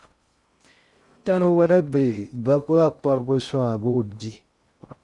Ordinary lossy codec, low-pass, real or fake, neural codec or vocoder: Opus, 64 kbps; 10.8 kHz; fake; codec, 16 kHz in and 24 kHz out, 0.6 kbps, FocalCodec, streaming, 2048 codes